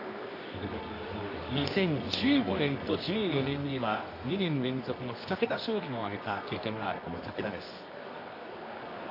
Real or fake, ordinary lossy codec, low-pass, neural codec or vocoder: fake; AAC, 32 kbps; 5.4 kHz; codec, 24 kHz, 0.9 kbps, WavTokenizer, medium music audio release